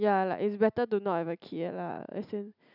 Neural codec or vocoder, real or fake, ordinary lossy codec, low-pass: none; real; none; 5.4 kHz